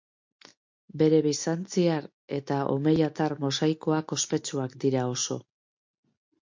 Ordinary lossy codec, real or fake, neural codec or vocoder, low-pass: MP3, 48 kbps; real; none; 7.2 kHz